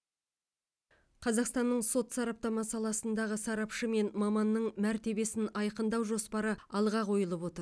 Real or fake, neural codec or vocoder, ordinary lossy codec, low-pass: real; none; none; none